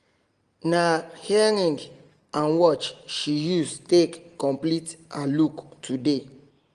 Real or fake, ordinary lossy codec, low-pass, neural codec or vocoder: real; Opus, 24 kbps; 9.9 kHz; none